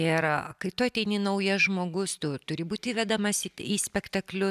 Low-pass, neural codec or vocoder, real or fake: 14.4 kHz; none; real